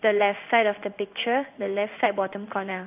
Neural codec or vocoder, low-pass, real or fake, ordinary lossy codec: codec, 16 kHz in and 24 kHz out, 1 kbps, XY-Tokenizer; 3.6 kHz; fake; AAC, 32 kbps